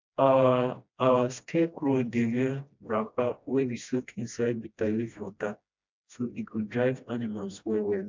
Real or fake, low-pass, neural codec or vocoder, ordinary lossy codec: fake; 7.2 kHz; codec, 16 kHz, 1 kbps, FreqCodec, smaller model; MP3, 64 kbps